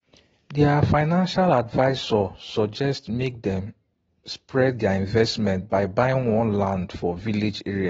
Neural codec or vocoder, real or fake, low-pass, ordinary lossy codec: none; real; 19.8 kHz; AAC, 24 kbps